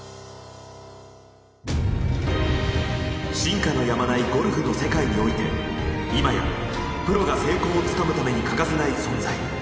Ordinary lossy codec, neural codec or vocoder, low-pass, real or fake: none; none; none; real